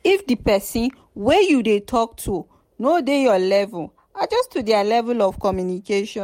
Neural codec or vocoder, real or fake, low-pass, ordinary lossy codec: none; real; 19.8 kHz; MP3, 64 kbps